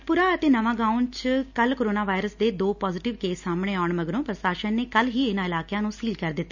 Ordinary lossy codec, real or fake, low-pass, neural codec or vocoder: none; real; 7.2 kHz; none